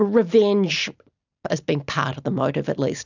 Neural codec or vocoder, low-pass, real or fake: none; 7.2 kHz; real